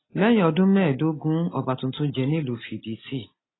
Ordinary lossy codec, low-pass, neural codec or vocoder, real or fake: AAC, 16 kbps; 7.2 kHz; none; real